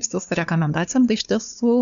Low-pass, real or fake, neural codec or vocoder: 7.2 kHz; fake; codec, 16 kHz, 2 kbps, FunCodec, trained on LibriTTS, 25 frames a second